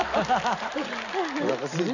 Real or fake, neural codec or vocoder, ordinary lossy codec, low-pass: real; none; none; 7.2 kHz